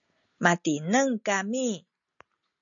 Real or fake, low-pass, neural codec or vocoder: real; 7.2 kHz; none